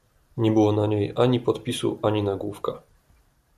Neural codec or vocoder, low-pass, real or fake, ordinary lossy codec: vocoder, 44.1 kHz, 128 mel bands every 512 samples, BigVGAN v2; 14.4 kHz; fake; AAC, 96 kbps